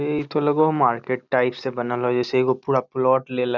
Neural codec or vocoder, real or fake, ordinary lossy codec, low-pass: none; real; none; 7.2 kHz